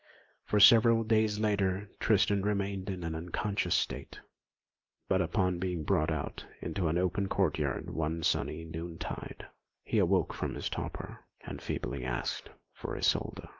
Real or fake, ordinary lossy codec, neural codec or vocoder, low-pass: real; Opus, 24 kbps; none; 7.2 kHz